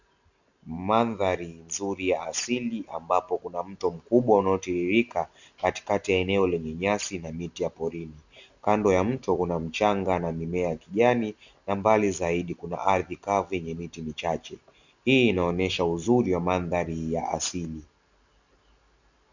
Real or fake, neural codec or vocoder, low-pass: real; none; 7.2 kHz